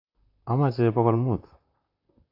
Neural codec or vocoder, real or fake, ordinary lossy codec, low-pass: vocoder, 44.1 kHz, 128 mel bands, Pupu-Vocoder; fake; AAC, 32 kbps; 5.4 kHz